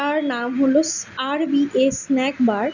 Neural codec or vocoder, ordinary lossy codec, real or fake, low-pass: none; none; real; 7.2 kHz